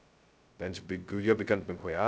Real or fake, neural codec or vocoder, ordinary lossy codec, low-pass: fake; codec, 16 kHz, 0.2 kbps, FocalCodec; none; none